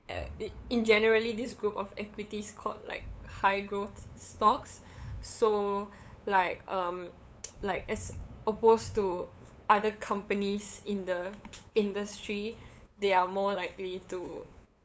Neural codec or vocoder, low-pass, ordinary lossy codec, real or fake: codec, 16 kHz, 8 kbps, FunCodec, trained on LibriTTS, 25 frames a second; none; none; fake